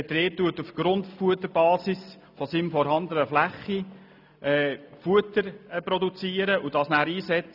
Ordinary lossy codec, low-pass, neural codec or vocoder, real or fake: none; 5.4 kHz; none; real